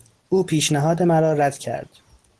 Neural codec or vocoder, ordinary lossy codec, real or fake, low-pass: none; Opus, 16 kbps; real; 10.8 kHz